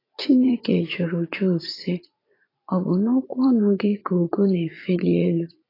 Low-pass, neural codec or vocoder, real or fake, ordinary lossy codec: 5.4 kHz; vocoder, 44.1 kHz, 80 mel bands, Vocos; fake; AAC, 24 kbps